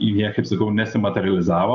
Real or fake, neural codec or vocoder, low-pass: real; none; 7.2 kHz